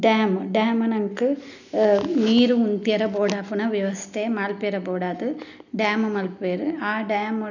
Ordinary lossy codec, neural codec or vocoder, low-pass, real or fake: none; none; 7.2 kHz; real